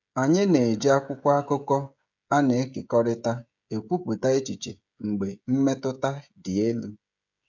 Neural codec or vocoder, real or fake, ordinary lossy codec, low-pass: codec, 16 kHz, 16 kbps, FreqCodec, smaller model; fake; none; 7.2 kHz